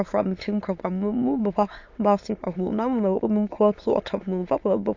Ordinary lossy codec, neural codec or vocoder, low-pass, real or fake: AAC, 48 kbps; autoencoder, 22.05 kHz, a latent of 192 numbers a frame, VITS, trained on many speakers; 7.2 kHz; fake